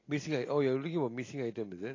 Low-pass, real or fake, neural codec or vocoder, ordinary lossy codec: 7.2 kHz; real; none; AAC, 32 kbps